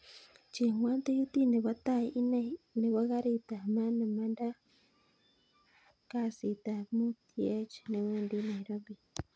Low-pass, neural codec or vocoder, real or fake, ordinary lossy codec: none; none; real; none